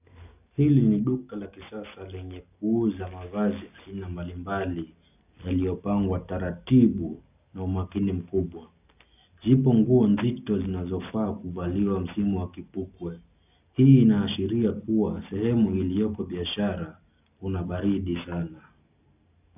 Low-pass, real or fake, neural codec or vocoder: 3.6 kHz; real; none